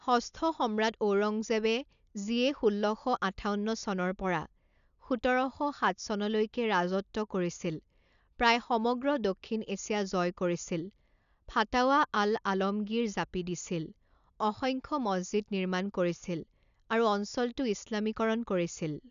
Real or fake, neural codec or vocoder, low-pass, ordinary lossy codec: real; none; 7.2 kHz; none